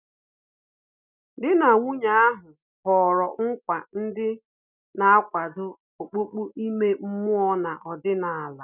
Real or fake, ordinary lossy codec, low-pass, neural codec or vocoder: real; none; 3.6 kHz; none